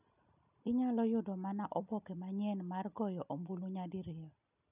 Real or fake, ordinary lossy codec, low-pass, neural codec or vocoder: real; none; 3.6 kHz; none